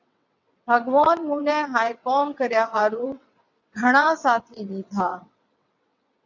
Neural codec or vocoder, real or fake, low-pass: vocoder, 22.05 kHz, 80 mel bands, WaveNeXt; fake; 7.2 kHz